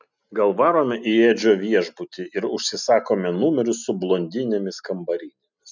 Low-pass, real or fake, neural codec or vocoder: 7.2 kHz; real; none